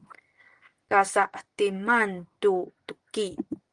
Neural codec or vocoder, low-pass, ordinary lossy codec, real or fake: none; 9.9 kHz; Opus, 24 kbps; real